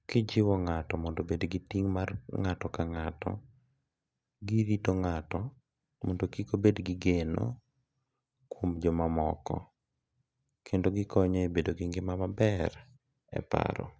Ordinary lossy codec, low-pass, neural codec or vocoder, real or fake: none; none; none; real